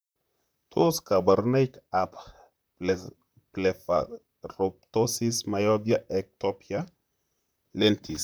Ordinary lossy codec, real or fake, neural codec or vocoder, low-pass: none; fake; vocoder, 44.1 kHz, 128 mel bands, Pupu-Vocoder; none